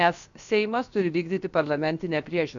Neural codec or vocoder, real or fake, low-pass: codec, 16 kHz, about 1 kbps, DyCAST, with the encoder's durations; fake; 7.2 kHz